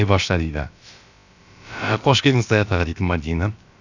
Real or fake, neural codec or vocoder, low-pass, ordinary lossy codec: fake; codec, 16 kHz, about 1 kbps, DyCAST, with the encoder's durations; 7.2 kHz; none